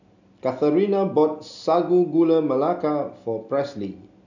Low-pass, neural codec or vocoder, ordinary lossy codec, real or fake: 7.2 kHz; none; none; real